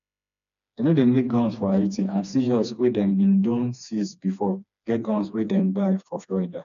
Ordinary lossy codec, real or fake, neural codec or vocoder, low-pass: none; fake; codec, 16 kHz, 2 kbps, FreqCodec, smaller model; 7.2 kHz